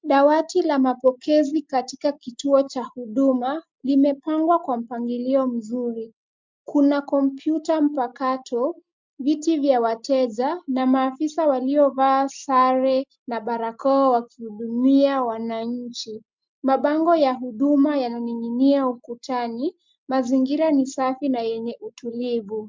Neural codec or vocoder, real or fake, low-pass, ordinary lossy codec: none; real; 7.2 kHz; MP3, 64 kbps